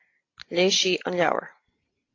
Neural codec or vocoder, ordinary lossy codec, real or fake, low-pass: none; AAC, 32 kbps; real; 7.2 kHz